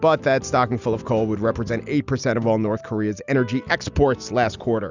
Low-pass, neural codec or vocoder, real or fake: 7.2 kHz; none; real